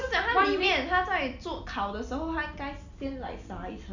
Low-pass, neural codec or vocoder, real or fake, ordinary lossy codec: 7.2 kHz; none; real; none